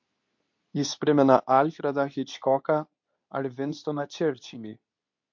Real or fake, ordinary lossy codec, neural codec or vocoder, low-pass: fake; MP3, 48 kbps; codec, 24 kHz, 0.9 kbps, WavTokenizer, medium speech release version 2; 7.2 kHz